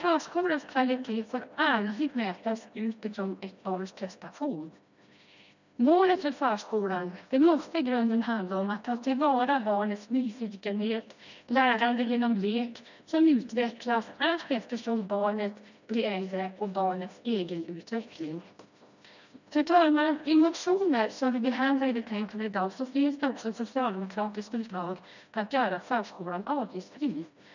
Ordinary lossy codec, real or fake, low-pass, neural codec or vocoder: none; fake; 7.2 kHz; codec, 16 kHz, 1 kbps, FreqCodec, smaller model